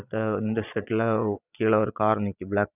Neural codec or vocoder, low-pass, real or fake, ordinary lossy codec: vocoder, 22.05 kHz, 80 mel bands, Vocos; 3.6 kHz; fake; none